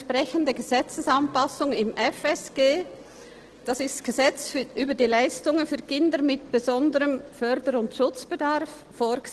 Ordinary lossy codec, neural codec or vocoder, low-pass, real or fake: Opus, 16 kbps; none; 10.8 kHz; real